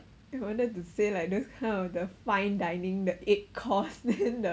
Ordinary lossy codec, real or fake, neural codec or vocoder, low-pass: none; real; none; none